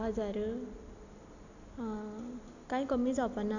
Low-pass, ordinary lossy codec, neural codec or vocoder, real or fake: 7.2 kHz; none; none; real